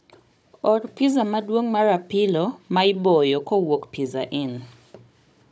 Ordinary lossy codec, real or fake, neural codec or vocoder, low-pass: none; fake; codec, 16 kHz, 16 kbps, FunCodec, trained on Chinese and English, 50 frames a second; none